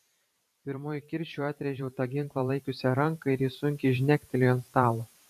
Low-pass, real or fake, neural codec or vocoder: 14.4 kHz; fake; vocoder, 44.1 kHz, 128 mel bands every 512 samples, BigVGAN v2